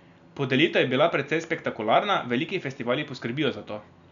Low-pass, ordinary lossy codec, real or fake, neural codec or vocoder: 7.2 kHz; none; real; none